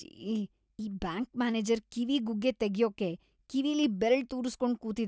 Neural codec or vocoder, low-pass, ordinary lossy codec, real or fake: none; none; none; real